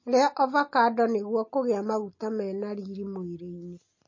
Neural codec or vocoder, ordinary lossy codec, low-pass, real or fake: none; MP3, 32 kbps; 7.2 kHz; real